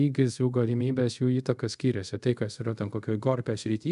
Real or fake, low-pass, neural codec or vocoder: fake; 10.8 kHz; codec, 24 kHz, 0.5 kbps, DualCodec